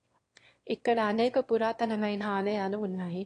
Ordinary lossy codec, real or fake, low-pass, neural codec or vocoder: AAC, 48 kbps; fake; 9.9 kHz; autoencoder, 22.05 kHz, a latent of 192 numbers a frame, VITS, trained on one speaker